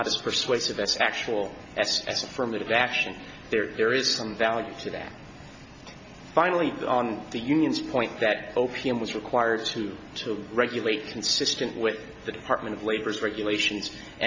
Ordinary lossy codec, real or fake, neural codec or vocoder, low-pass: MP3, 48 kbps; real; none; 7.2 kHz